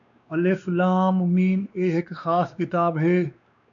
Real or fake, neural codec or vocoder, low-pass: fake; codec, 16 kHz, 2 kbps, X-Codec, WavLM features, trained on Multilingual LibriSpeech; 7.2 kHz